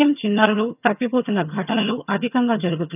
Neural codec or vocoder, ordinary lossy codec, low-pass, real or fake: vocoder, 22.05 kHz, 80 mel bands, HiFi-GAN; none; 3.6 kHz; fake